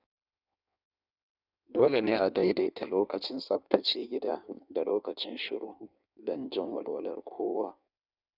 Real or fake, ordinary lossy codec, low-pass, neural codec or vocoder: fake; none; 5.4 kHz; codec, 16 kHz in and 24 kHz out, 1.1 kbps, FireRedTTS-2 codec